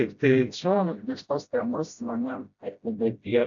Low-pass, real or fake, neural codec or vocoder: 7.2 kHz; fake; codec, 16 kHz, 0.5 kbps, FreqCodec, smaller model